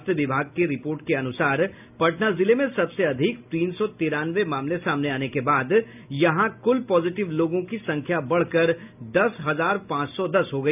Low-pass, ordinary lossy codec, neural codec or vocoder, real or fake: 3.6 kHz; none; none; real